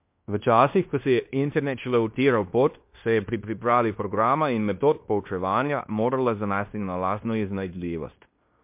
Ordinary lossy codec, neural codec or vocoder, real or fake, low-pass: MP3, 32 kbps; codec, 16 kHz in and 24 kHz out, 0.9 kbps, LongCat-Audio-Codec, fine tuned four codebook decoder; fake; 3.6 kHz